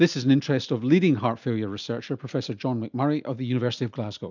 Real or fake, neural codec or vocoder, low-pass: real; none; 7.2 kHz